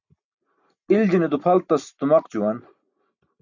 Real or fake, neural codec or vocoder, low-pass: real; none; 7.2 kHz